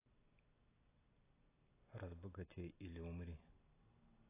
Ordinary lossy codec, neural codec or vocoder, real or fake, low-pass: AAC, 16 kbps; none; real; 3.6 kHz